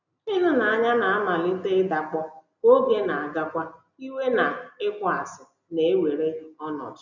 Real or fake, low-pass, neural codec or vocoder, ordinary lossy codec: real; 7.2 kHz; none; none